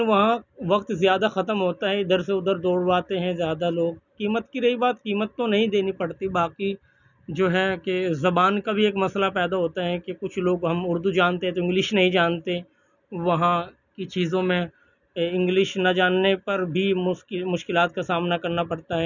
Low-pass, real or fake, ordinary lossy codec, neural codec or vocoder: 7.2 kHz; real; none; none